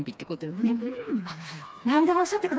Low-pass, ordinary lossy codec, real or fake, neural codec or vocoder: none; none; fake; codec, 16 kHz, 2 kbps, FreqCodec, smaller model